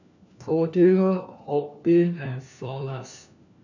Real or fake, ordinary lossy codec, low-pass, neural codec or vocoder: fake; none; 7.2 kHz; codec, 16 kHz, 1 kbps, FunCodec, trained on LibriTTS, 50 frames a second